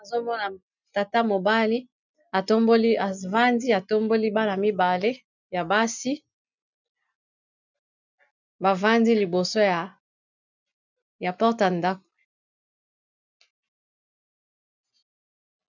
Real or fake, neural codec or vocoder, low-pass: real; none; 7.2 kHz